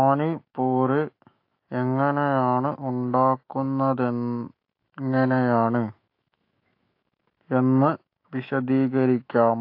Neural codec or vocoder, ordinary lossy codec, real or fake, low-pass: autoencoder, 48 kHz, 128 numbers a frame, DAC-VAE, trained on Japanese speech; AAC, 32 kbps; fake; 5.4 kHz